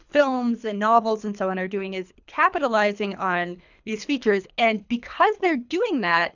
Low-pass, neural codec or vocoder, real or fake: 7.2 kHz; codec, 24 kHz, 3 kbps, HILCodec; fake